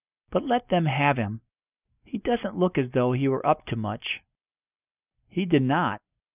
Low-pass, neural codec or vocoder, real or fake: 3.6 kHz; none; real